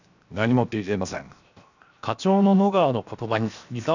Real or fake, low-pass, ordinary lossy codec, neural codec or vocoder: fake; 7.2 kHz; none; codec, 16 kHz, 0.7 kbps, FocalCodec